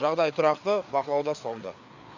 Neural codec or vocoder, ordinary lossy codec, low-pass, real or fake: codec, 16 kHz, 4 kbps, FreqCodec, larger model; none; 7.2 kHz; fake